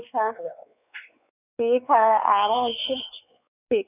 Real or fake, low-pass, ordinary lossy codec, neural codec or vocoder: fake; 3.6 kHz; none; codec, 16 kHz, 4 kbps, X-Codec, WavLM features, trained on Multilingual LibriSpeech